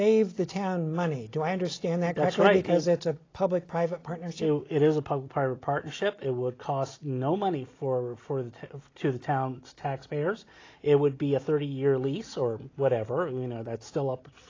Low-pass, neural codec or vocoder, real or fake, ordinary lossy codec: 7.2 kHz; none; real; AAC, 32 kbps